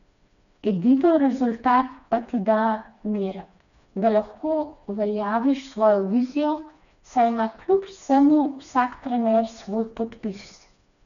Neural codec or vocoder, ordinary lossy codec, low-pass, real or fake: codec, 16 kHz, 2 kbps, FreqCodec, smaller model; none; 7.2 kHz; fake